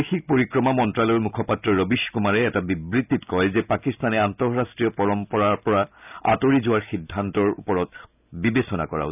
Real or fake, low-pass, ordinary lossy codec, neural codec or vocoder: real; 3.6 kHz; none; none